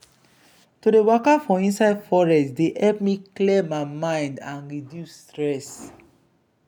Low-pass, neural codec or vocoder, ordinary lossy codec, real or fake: 19.8 kHz; none; none; real